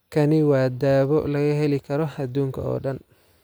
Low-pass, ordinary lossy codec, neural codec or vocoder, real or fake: none; none; none; real